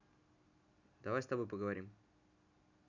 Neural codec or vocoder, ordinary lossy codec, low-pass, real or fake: none; none; 7.2 kHz; real